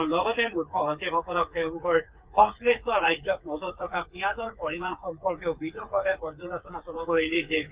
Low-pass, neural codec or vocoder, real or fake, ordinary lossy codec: 3.6 kHz; codec, 16 kHz, 4 kbps, FreqCodec, smaller model; fake; Opus, 32 kbps